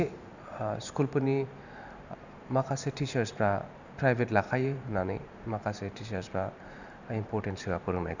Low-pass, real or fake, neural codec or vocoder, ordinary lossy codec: 7.2 kHz; fake; vocoder, 44.1 kHz, 128 mel bands every 512 samples, BigVGAN v2; none